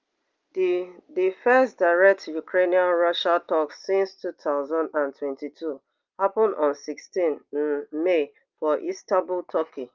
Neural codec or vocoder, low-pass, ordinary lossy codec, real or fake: none; 7.2 kHz; Opus, 24 kbps; real